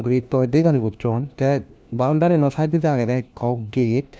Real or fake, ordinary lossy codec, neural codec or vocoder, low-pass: fake; none; codec, 16 kHz, 1 kbps, FunCodec, trained on LibriTTS, 50 frames a second; none